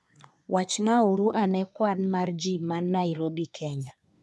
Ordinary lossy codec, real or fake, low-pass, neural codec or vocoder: none; fake; none; codec, 24 kHz, 1 kbps, SNAC